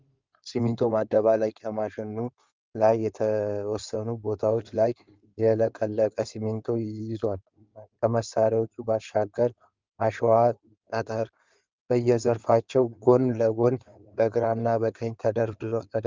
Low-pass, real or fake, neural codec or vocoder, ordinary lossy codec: 7.2 kHz; fake; codec, 16 kHz in and 24 kHz out, 2.2 kbps, FireRedTTS-2 codec; Opus, 24 kbps